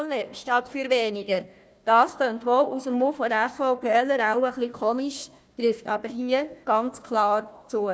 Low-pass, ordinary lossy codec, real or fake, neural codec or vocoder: none; none; fake; codec, 16 kHz, 1 kbps, FunCodec, trained on Chinese and English, 50 frames a second